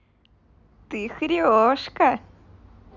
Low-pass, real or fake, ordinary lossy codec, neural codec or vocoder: 7.2 kHz; real; none; none